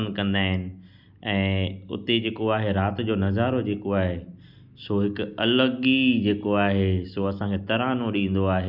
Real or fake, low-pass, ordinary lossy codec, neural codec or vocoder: real; 5.4 kHz; none; none